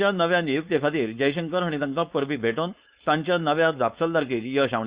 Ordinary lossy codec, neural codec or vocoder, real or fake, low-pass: Opus, 64 kbps; codec, 16 kHz, 4.8 kbps, FACodec; fake; 3.6 kHz